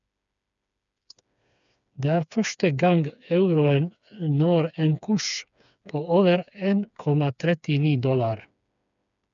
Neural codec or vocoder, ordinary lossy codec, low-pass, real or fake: codec, 16 kHz, 4 kbps, FreqCodec, smaller model; none; 7.2 kHz; fake